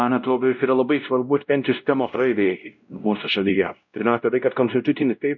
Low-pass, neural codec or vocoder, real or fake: 7.2 kHz; codec, 16 kHz, 0.5 kbps, X-Codec, WavLM features, trained on Multilingual LibriSpeech; fake